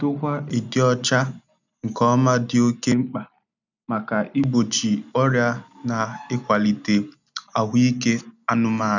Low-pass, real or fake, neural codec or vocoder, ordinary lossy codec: 7.2 kHz; real; none; none